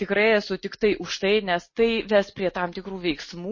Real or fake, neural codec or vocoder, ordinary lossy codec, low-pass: real; none; MP3, 32 kbps; 7.2 kHz